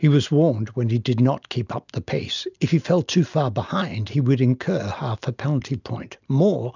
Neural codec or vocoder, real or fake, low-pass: none; real; 7.2 kHz